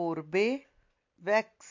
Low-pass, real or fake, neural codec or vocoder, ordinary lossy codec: 7.2 kHz; real; none; MP3, 48 kbps